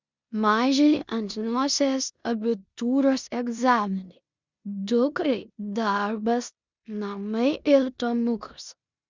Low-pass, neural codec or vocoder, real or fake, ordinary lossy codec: 7.2 kHz; codec, 16 kHz in and 24 kHz out, 0.9 kbps, LongCat-Audio-Codec, four codebook decoder; fake; Opus, 64 kbps